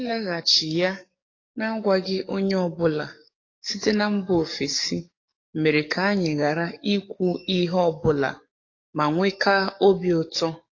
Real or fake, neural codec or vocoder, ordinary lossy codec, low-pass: fake; codec, 44.1 kHz, 7.8 kbps, DAC; AAC, 32 kbps; 7.2 kHz